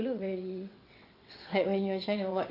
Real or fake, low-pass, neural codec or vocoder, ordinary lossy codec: fake; 5.4 kHz; vocoder, 22.05 kHz, 80 mel bands, WaveNeXt; none